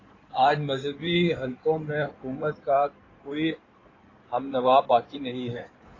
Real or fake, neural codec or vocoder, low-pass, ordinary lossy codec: fake; codec, 16 kHz in and 24 kHz out, 2.2 kbps, FireRedTTS-2 codec; 7.2 kHz; AAC, 32 kbps